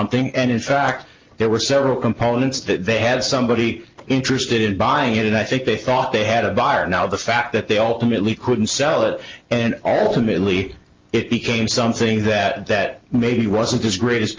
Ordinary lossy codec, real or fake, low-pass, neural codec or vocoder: Opus, 24 kbps; real; 7.2 kHz; none